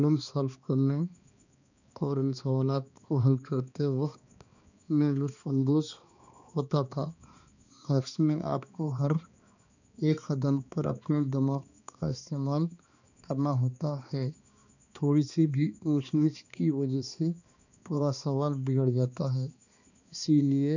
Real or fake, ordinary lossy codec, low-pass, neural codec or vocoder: fake; none; 7.2 kHz; codec, 16 kHz, 2 kbps, X-Codec, HuBERT features, trained on balanced general audio